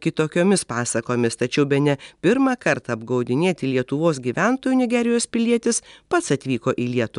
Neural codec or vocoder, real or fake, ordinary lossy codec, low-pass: none; real; MP3, 96 kbps; 10.8 kHz